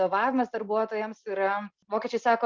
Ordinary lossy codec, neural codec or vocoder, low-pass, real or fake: Opus, 24 kbps; none; 7.2 kHz; real